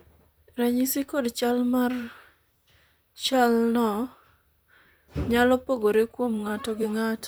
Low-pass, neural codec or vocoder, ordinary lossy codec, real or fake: none; vocoder, 44.1 kHz, 128 mel bands, Pupu-Vocoder; none; fake